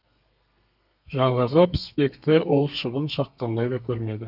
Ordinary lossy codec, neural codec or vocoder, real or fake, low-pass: none; codec, 32 kHz, 1.9 kbps, SNAC; fake; 5.4 kHz